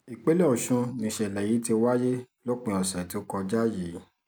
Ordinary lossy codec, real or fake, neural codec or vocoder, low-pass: none; real; none; none